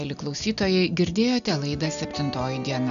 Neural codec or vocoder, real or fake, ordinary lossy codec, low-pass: none; real; MP3, 96 kbps; 7.2 kHz